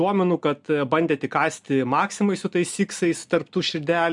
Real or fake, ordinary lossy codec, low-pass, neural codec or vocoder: real; MP3, 96 kbps; 10.8 kHz; none